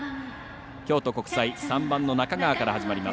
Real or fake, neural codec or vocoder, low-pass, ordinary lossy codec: real; none; none; none